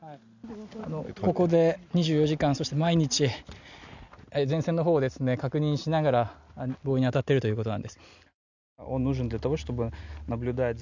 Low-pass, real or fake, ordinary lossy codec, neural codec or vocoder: 7.2 kHz; real; none; none